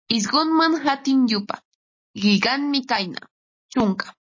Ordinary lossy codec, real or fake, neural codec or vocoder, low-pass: MP3, 32 kbps; real; none; 7.2 kHz